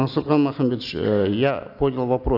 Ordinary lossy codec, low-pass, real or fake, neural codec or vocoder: none; 5.4 kHz; real; none